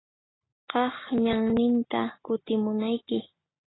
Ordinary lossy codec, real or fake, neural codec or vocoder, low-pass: AAC, 16 kbps; real; none; 7.2 kHz